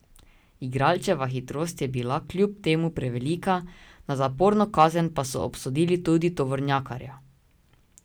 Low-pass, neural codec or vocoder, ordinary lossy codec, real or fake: none; none; none; real